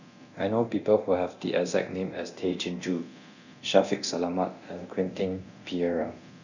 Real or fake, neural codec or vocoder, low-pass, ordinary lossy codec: fake; codec, 24 kHz, 0.9 kbps, DualCodec; 7.2 kHz; none